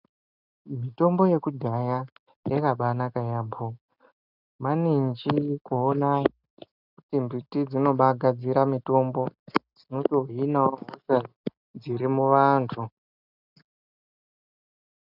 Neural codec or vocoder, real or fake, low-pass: none; real; 5.4 kHz